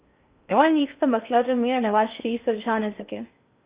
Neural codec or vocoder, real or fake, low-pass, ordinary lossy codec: codec, 16 kHz in and 24 kHz out, 0.6 kbps, FocalCodec, streaming, 2048 codes; fake; 3.6 kHz; Opus, 64 kbps